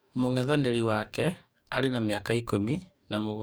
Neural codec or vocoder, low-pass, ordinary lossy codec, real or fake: codec, 44.1 kHz, 2.6 kbps, DAC; none; none; fake